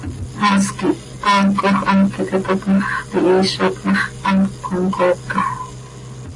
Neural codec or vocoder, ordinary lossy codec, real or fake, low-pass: none; AAC, 32 kbps; real; 10.8 kHz